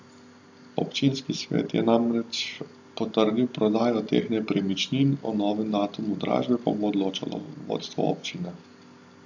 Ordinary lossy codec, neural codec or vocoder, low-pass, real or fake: AAC, 48 kbps; none; 7.2 kHz; real